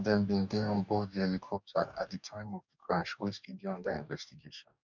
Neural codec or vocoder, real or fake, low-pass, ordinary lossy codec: codec, 44.1 kHz, 2.6 kbps, DAC; fake; 7.2 kHz; none